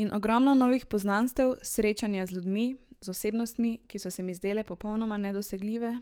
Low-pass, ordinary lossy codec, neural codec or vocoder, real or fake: none; none; codec, 44.1 kHz, 7.8 kbps, DAC; fake